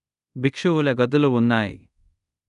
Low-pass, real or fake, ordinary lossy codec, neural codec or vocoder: 10.8 kHz; fake; none; codec, 24 kHz, 0.5 kbps, DualCodec